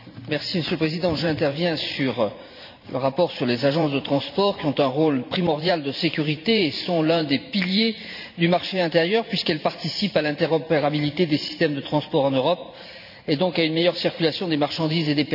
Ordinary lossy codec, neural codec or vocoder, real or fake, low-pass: none; vocoder, 44.1 kHz, 128 mel bands every 512 samples, BigVGAN v2; fake; 5.4 kHz